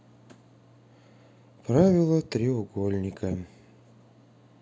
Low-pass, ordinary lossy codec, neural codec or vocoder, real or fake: none; none; none; real